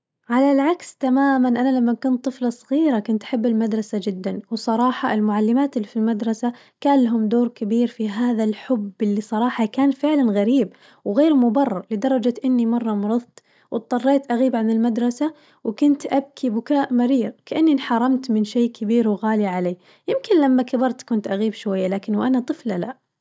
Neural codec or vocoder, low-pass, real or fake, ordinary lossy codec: none; none; real; none